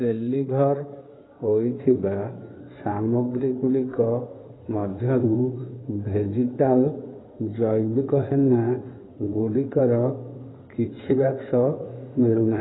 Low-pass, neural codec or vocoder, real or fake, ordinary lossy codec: 7.2 kHz; codec, 16 kHz in and 24 kHz out, 2.2 kbps, FireRedTTS-2 codec; fake; AAC, 16 kbps